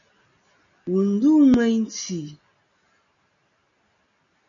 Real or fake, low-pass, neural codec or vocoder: real; 7.2 kHz; none